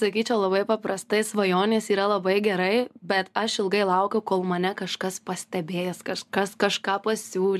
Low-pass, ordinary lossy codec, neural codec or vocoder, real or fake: 14.4 kHz; MP3, 96 kbps; none; real